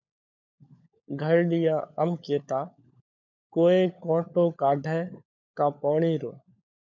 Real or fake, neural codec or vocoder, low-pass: fake; codec, 16 kHz, 16 kbps, FunCodec, trained on LibriTTS, 50 frames a second; 7.2 kHz